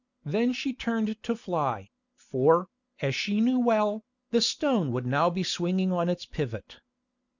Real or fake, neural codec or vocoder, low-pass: real; none; 7.2 kHz